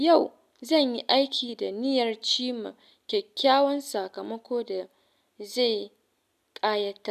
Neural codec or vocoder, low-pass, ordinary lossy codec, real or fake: none; 14.4 kHz; none; real